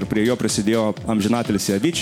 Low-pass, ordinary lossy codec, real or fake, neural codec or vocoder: 19.8 kHz; MP3, 96 kbps; real; none